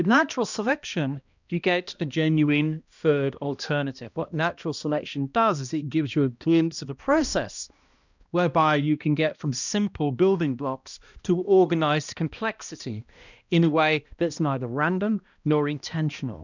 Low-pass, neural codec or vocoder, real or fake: 7.2 kHz; codec, 16 kHz, 1 kbps, X-Codec, HuBERT features, trained on balanced general audio; fake